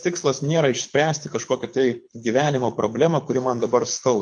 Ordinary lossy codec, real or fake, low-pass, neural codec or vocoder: MP3, 64 kbps; fake; 9.9 kHz; codec, 24 kHz, 6 kbps, HILCodec